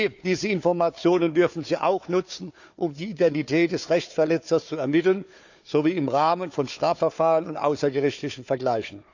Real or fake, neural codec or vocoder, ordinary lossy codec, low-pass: fake; codec, 16 kHz, 4 kbps, FunCodec, trained on Chinese and English, 50 frames a second; none; 7.2 kHz